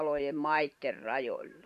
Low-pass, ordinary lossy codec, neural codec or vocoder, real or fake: 14.4 kHz; none; vocoder, 44.1 kHz, 128 mel bands every 512 samples, BigVGAN v2; fake